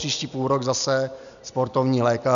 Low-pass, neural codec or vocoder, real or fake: 7.2 kHz; none; real